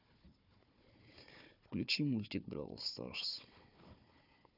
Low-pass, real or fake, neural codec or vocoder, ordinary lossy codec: 5.4 kHz; fake; codec, 16 kHz, 4 kbps, FunCodec, trained on Chinese and English, 50 frames a second; none